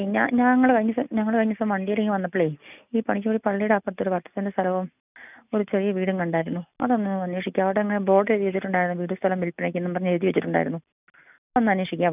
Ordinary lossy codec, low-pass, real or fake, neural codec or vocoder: none; 3.6 kHz; real; none